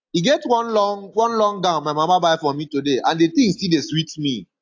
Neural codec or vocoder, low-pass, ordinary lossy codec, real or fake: none; 7.2 kHz; AAC, 48 kbps; real